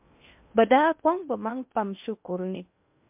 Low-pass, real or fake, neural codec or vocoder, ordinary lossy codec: 3.6 kHz; fake; codec, 16 kHz in and 24 kHz out, 0.6 kbps, FocalCodec, streaming, 2048 codes; MP3, 32 kbps